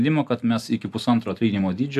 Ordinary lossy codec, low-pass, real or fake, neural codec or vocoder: MP3, 96 kbps; 14.4 kHz; real; none